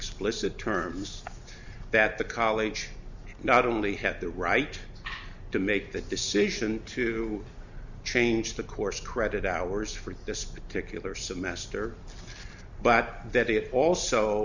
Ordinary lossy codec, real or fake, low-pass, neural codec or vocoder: Opus, 64 kbps; real; 7.2 kHz; none